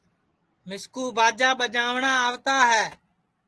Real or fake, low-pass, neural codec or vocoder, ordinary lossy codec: real; 10.8 kHz; none; Opus, 16 kbps